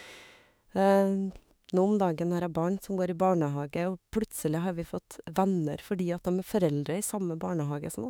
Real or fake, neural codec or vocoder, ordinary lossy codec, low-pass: fake; autoencoder, 48 kHz, 32 numbers a frame, DAC-VAE, trained on Japanese speech; none; none